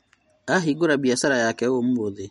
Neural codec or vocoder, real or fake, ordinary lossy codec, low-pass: none; real; MP3, 48 kbps; 14.4 kHz